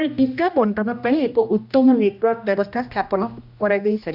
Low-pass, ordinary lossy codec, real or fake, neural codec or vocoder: 5.4 kHz; none; fake; codec, 16 kHz, 1 kbps, X-Codec, HuBERT features, trained on general audio